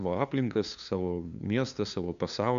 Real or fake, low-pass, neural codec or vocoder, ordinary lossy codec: fake; 7.2 kHz; codec, 16 kHz, 2 kbps, FunCodec, trained on LibriTTS, 25 frames a second; AAC, 96 kbps